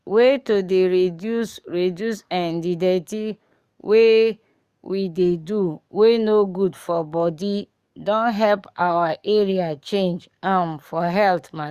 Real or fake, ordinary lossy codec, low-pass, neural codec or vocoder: fake; Opus, 64 kbps; 14.4 kHz; codec, 44.1 kHz, 7.8 kbps, DAC